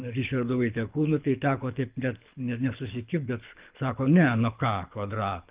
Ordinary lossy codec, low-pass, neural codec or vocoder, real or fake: Opus, 24 kbps; 3.6 kHz; codec, 24 kHz, 6 kbps, HILCodec; fake